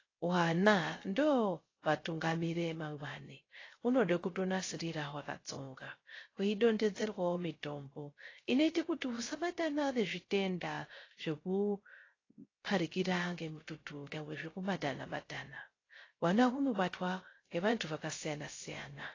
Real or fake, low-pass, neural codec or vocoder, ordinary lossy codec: fake; 7.2 kHz; codec, 16 kHz, 0.3 kbps, FocalCodec; AAC, 32 kbps